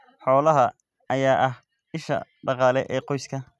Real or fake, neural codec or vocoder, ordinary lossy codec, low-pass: real; none; none; none